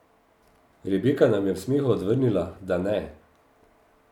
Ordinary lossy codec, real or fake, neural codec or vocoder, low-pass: none; real; none; 19.8 kHz